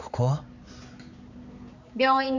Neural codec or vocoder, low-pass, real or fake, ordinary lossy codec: codec, 16 kHz, 4 kbps, X-Codec, HuBERT features, trained on balanced general audio; 7.2 kHz; fake; Opus, 64 kbps